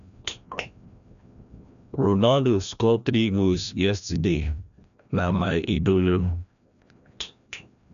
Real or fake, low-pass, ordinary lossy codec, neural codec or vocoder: fake; 7.2 kHz; none; codec, 16 kHz, 1 kbps, FreqCodec, larger model